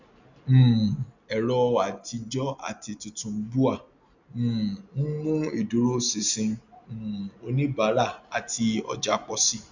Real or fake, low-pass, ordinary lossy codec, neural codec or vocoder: real; 7.2 kHz; none; none